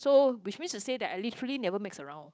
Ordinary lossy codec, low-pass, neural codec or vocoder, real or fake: none; none; codec, 16 kHz, 8 kbps, FunCodec, trained on Chinese and English, 25 frames a second; fake